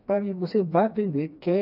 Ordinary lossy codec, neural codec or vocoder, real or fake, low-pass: none; codec, 16 kHz, 2 kbps, FreqCodec, smaller model; fake; 5.4 kHz